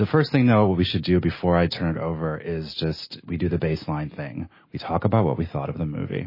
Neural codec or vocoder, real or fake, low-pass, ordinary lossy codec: none; real; 5.4 kHz; MP3, 24 kbps